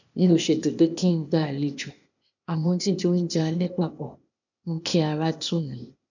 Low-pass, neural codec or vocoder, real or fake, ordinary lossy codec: 7.2 kHz; codec, 16 kHz, 0.8 kbps, ZipCodec; fake; none